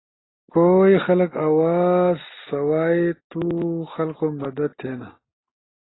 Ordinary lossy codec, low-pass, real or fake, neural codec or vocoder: AAC, 16 kbps; 7.2 kHz; real; none